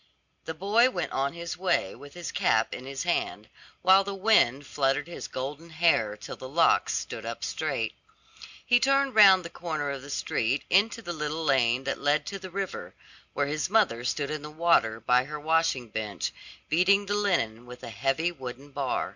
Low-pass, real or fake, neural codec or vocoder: 7.2 kHz; real; none